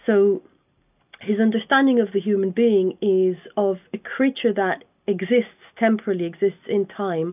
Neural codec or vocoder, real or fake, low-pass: none; real; 3.6 kHz